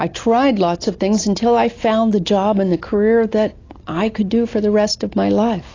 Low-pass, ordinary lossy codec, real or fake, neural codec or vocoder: 7.2 kHz; AAC, 32 kbps; real; none